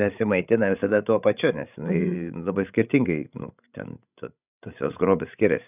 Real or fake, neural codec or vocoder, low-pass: fake; codec, 16 kHz, 16 kbps, FreqCodec, larger model; 3.6 kHz